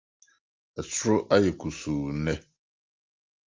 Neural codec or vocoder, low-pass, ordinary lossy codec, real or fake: none; 7.2 kHz; Opus, 32 kbps; real